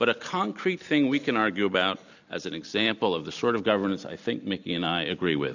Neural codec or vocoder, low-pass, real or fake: none; 7.2 kHz; real